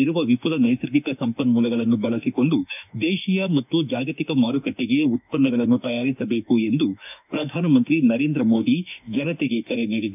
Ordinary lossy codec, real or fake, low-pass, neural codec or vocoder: none; fake; 3.6 kHz; autoencoder, 48 kHz, 32 numbers a frame, DAC-VAE, trained on Japanese speech